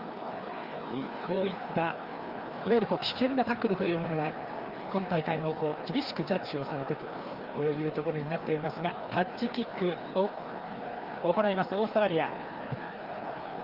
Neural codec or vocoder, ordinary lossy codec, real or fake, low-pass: codec, 16 kHz, 2 kbps, FreqCodec, larger model; Opus, 24 kbps; fake; 5.4 kHz